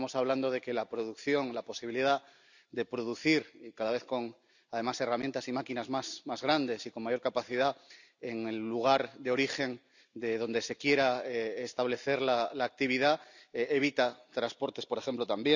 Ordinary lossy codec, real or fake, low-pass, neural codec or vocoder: none; real; 7.2 kHz; none